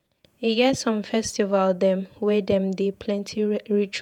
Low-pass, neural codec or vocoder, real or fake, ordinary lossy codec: 19.8 kHz; vocoder, 48 kHz, 128 mel bands, Vocos; fake; none